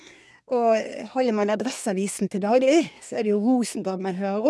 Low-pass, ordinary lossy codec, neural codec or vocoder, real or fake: none; none; codec, 24 kHz, 1 kbps, SNAC; fake